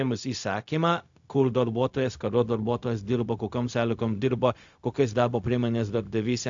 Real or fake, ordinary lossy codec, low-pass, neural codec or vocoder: fake; AAC, 64 kbps; 7.2 kHz; codec, 16 kHz, 0.4 kbps, LongCat-Audio-Codec